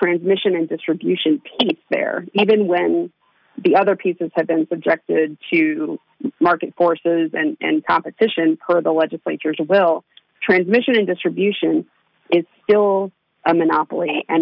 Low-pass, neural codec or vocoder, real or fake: 5.4 kHz; none; real